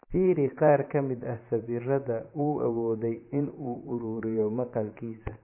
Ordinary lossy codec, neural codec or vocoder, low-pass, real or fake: MP3, 24 kbps; vocoder, 44.1 kHz, 128 mel bands, Pupu-Vocoder; 3.6 kHz; fake